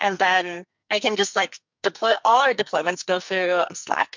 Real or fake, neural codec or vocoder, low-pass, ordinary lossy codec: fake; codec, 44.1 kHz, 2.6 kbps, SNAC; 7.2 kHz; MP3, 64 kbps